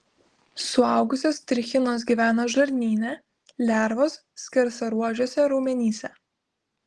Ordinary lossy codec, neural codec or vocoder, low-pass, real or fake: Opus, 16 kbps; none; 10.8 kHz; real